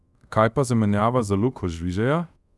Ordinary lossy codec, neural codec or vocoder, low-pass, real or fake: none; codec, 24 kHz, 0.5 kbps, DualCodec; none; fake